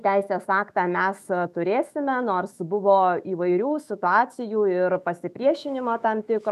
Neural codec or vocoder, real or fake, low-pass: autoencoder, 48 kHz, 128 numbers a frame, DAC-VAE, trained on Japanese speech; fake; 14.4 kHz